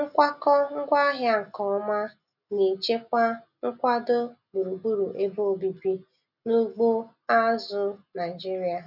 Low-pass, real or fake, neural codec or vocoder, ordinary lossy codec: 5.4 kHz; real; none; none